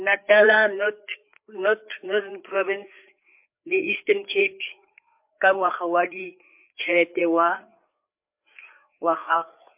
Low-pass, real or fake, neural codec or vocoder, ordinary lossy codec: 3.6 kHz; fake; codec, 16 kHz, 4 kbps, FreqCodec, larger model; MP3, 32 kbps